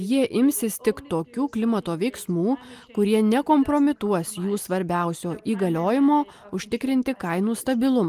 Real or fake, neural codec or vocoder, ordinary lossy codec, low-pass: real; none; Opus, 32 kbps; 14.4 kHz